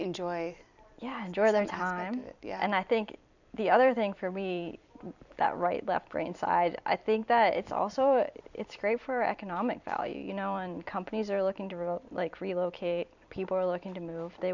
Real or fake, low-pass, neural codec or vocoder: fake; 7.2 kHz; vocoder, 44.1 kHz, 128 mel bands every 256 samples, BigVGAN v2